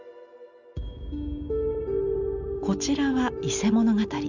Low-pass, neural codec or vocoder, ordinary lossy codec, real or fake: 7.2 kHz; none; none; real